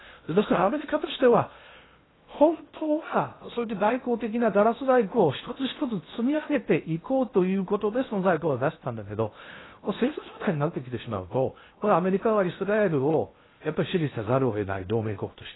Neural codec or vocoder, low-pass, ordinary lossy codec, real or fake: codec, 16 kHz in and 24 kHz out, 0.6 kbps, FocalCodec, streaming, 4096 codes; 7.2 kHz; AAC, 16 kbps; fake